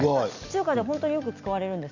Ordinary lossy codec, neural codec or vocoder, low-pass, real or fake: none; none; 7.2 kHz; real